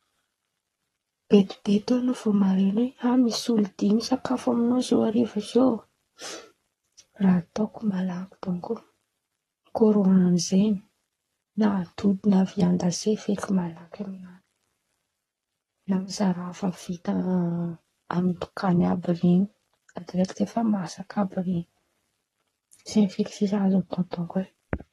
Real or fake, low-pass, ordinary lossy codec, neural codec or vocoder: fake; 19.8 kHz; AAC, 32 kbps; codec, 44.1 kHz, 7.8 kbps, Pupu-Codec